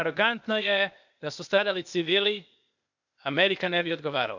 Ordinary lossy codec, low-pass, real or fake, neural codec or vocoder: none; 7.2 kHz; fake; codec, 16 kHz, 0.8 kbps, ZipCodec